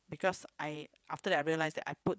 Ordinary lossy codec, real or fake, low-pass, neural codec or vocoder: none; fake; none; codec, 16 kHz, 8 kbps, FreqCodec, larger model